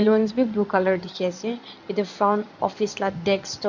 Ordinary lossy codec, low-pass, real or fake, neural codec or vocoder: none; 7.2 kHz; fake; codec, 16 kHz in and 24 kHz out, 2.2 kbps, FireRedTTS-2 codec